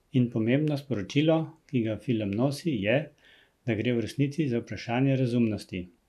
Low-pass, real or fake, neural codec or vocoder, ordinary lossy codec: 14.4 kHz; real; none; none